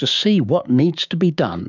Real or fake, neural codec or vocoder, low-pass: fake; codec, 16 kHz, 6 kbps, DAC; 7.2 kHz